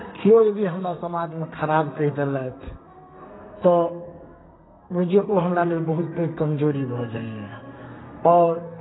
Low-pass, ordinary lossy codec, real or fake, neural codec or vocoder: 7.2 kHz; AAC, 16 kbps; fake; codec, 32 kHz, 1.9 kbps, SNAC